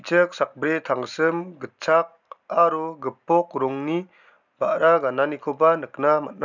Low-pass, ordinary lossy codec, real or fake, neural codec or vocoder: 7.2 kHz; none; real; none